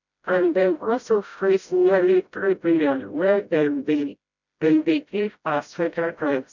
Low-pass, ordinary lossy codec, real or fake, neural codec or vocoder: 7.2 kHz; none; fake; codec, 16 kHz, 0.5 kbps, FreqCodec, smaller model